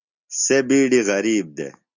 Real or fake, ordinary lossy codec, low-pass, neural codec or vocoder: real; Opus, 64 kbps; 7.2 kHz; none